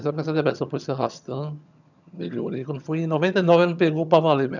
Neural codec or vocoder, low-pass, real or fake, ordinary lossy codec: vocoder, 22.05 kHz, 80 mel bands, HiFi-GAN; 7.2 kHz; fake; none